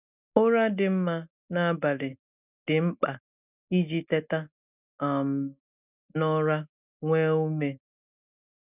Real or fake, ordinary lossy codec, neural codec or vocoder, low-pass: real; none; none; 3.6 kHz